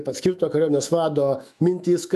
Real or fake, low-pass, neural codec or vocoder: fake; 14.4 kHz; autoencoder, 48 kHz, 128 numbers a frame, DAC-VAE, trained on Japanese speech